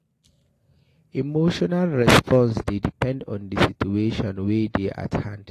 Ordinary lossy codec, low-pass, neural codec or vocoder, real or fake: AAC, 48 kbps; 14.4 kHz; none; real